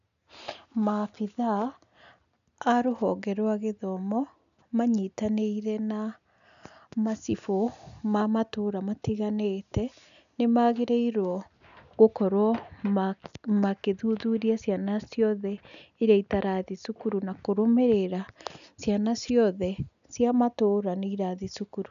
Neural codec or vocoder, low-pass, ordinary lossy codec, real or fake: none; 7.2 kHz; none; real